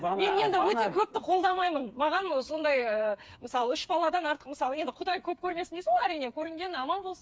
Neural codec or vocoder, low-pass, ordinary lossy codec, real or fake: codec, 16 kHz, 4 kbps, FreqCodec, smaller model; none; none; fake